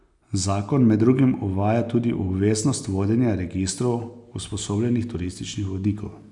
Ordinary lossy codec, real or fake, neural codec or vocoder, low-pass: none; real; none; 10.8 kHz